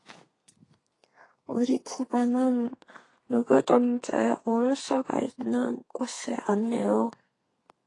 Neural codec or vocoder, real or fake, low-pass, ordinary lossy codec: codec, 32 kHz, 1.9 kbps, SNAC; fake; 10.8 kHz; AAC, 32 kbps